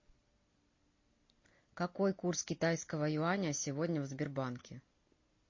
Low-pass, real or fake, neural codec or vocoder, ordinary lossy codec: 7.2 kHz; real; none; MP3, 32 kbps